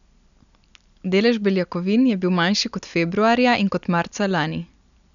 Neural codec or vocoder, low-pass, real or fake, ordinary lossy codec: none; 7.2 kHz; real; none